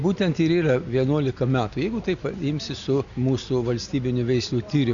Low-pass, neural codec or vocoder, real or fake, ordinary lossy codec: 7.2 kHz; none; real; Opus, 64 kbps